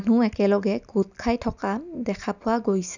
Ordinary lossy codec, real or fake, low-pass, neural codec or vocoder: none; real; 7.2 kHz; none